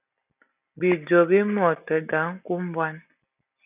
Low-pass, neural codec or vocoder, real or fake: 3.6 kHz; none; real